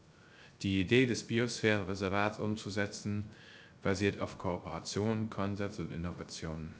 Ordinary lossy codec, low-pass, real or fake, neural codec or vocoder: none; none; fake; codec, 16 kHz, 0.3 kbps, FocalCodec